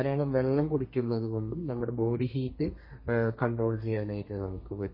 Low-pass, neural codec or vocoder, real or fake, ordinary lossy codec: 5.4 kHz; codec, 32 kHz, 1.9 kbps, SNAC; fake; MP3, 24 kbps